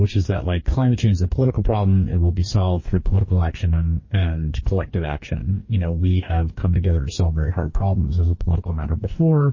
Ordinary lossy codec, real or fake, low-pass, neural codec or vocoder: MP3, 32 kbps; fake; 7.2 kHz; codec, 44.1 kHz, 2.6 kbps, DAC